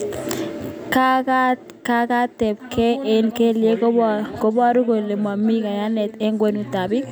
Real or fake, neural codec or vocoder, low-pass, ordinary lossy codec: real; none; none; none